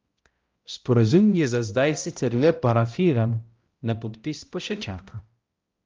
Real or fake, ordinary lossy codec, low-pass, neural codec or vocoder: fake; Opus, 32 kbps; 7.2 kHz; codec, 16 kHz, 0.5 kbps, X-Codec, HuBERT features, trained on balanced general audio